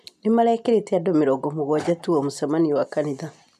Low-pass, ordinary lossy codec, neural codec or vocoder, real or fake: 14.4 kHz; none; none; real